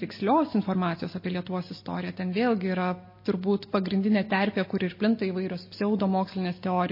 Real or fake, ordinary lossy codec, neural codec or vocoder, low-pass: real; MP3, 24 kbps; none; 5.4 kHz